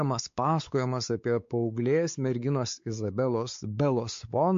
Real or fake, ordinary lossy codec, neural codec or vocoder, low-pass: fake; MP3, 48 kbps; codec, 16 kHz, 4 kbps, X-Codec, WavLM features, trained on Multilingual LibriSpeech; 7.2 kHz